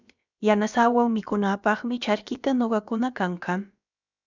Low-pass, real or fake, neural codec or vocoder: 7.2 kHz; fake; codec, 16 kHz, about 1 kbps, DyCAST, with the encoder's durations